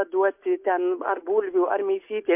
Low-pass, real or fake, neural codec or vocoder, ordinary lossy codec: 3.6 kHz; real; none; MP3, 32 kbps